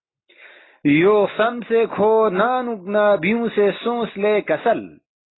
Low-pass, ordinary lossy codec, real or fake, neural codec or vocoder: 7.2 kHz; AAC, 16 kbps; real; none